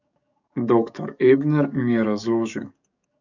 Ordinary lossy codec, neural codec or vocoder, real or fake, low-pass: none; codec, 44.1 kHz, 7.8 kbps, DAC; fake; 7.2 kHz